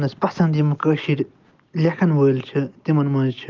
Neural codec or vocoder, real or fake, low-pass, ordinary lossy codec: none; real; 7.2 kHz; Opus, 32 kbps